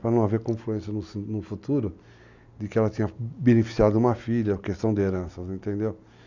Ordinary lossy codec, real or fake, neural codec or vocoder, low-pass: none; real; none; 7.2 kHz